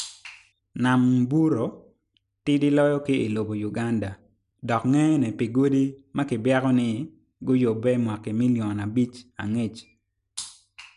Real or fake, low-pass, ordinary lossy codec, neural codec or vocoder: real; 10.8 kHz; none; none